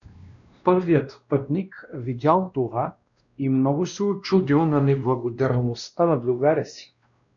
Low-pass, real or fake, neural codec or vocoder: 7.2 kHz; fake; codec, 16 kHz, 1 kbps, X-Codec, WavLM features, trained on Multilingual LibriSpeech